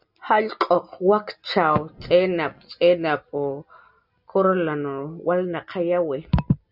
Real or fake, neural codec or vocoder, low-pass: real; none; 5.4 kHz